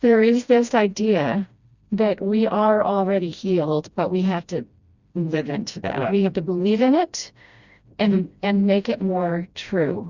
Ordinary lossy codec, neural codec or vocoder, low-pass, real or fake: Opus, 64 kbps; codec, 16 kHz, 1 kbps, FreqCodec, smaller model; 7.2 kHz; fake